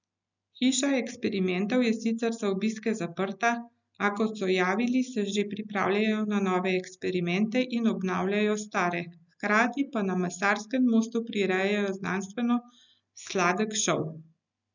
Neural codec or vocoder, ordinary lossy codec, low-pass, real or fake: none; none; 7.2 kHz; real